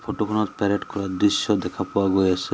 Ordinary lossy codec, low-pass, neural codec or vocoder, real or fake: none; none; none; real